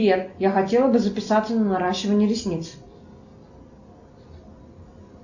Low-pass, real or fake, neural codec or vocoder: 7.2 kHz; real; none